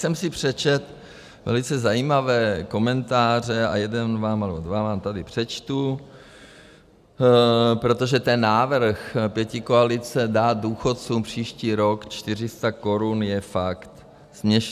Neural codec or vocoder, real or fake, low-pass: none; real; 14.4 kHz